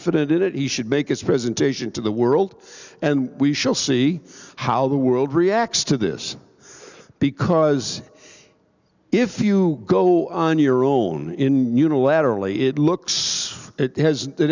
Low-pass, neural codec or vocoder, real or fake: 7.2 kHz; none; real